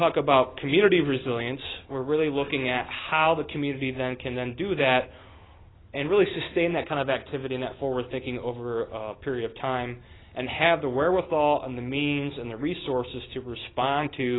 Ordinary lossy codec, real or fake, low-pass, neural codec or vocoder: AAC, 16 kbps; real; 7.2 kHz; none